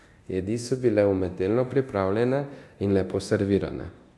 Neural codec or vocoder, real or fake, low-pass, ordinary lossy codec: codec, 24 kHz, 0.9 kbps, DualCodec; fake; none; none